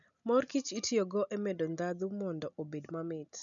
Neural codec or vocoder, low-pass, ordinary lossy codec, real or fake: none; 7.2 kHz; none; real